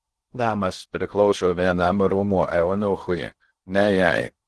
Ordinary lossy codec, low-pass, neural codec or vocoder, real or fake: Opus, 16 kbps; 10.8 kHz; codec, 16 kHz in and 24 kHz out, 0.6 kbps, FocalCodec, streaming, 2048 codes; fake